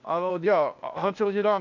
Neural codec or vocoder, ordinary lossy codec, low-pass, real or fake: codec, 16 kHz, 0.8 kbps, ZipCodec; none; 7.2 kHz; fake